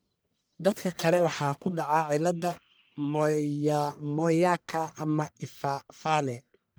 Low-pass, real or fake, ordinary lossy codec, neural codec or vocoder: none; fake; none; codec, 44.1 kHz, 1.7 kbps, Pupu-Codec